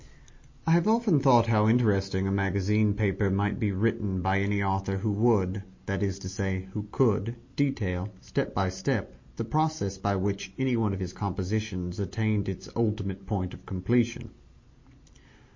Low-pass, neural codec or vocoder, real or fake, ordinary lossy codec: 7.2 kHz; none; real; MP3, 32 kbps